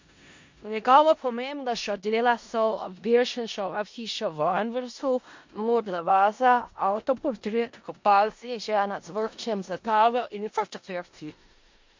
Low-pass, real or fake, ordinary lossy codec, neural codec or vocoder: 7.2 kHz; fake; MP3, 48 kbps; codec, 16 kHz in and 24 kHz out, 0.4 kbps, LongCat-Audio-Codec, four codebook decoder